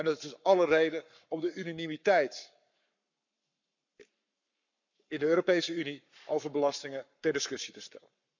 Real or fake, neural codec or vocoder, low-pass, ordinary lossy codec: fake; codec, 44.1 kHz, 7.8 kbps, Pupu-Codec; 7.2 kHz; none